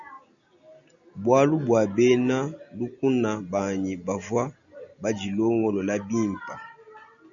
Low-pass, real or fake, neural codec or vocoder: 7.2 kHz; real; none